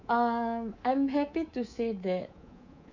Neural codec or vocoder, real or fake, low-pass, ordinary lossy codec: codec, 24 kHz, 3.1 kbps, DualCodec; fake; 7.2 kHz; none